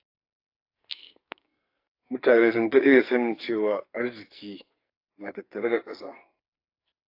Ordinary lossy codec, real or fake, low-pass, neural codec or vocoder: AAC, 32 kbps; fake; 5.4 kHz; codec, 44.1 kHz, 2.6 kbps, SNAC